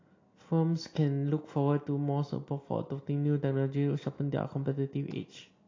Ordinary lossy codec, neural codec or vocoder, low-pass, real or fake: AAC, 32 kbps; none; 7.2 kHz; real